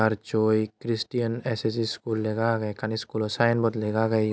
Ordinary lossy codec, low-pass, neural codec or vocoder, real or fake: none; none; none; real